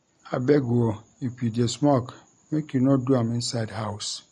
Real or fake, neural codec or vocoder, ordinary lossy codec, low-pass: real; none; MP3, 48 kbps; 19.8 kHz